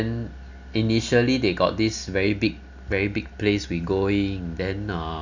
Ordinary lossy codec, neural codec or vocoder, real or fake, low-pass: none; none; real; 7.2 kHz